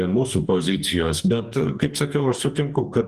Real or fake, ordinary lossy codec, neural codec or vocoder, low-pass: fake; Opus, 24 kbps; codec, 44.1 kHz, 2.6 kbps, DAC; 14.4 kHz